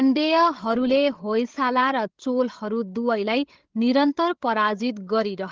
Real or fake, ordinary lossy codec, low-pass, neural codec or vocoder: fake; Opus, 16 kbps; 7.2 kHz; codec, 16 kHz, 8 kbps, FreqCodec, larger model